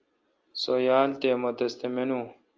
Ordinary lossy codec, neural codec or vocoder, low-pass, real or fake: Opus, 24 kbps; none; 7.2 kHz; real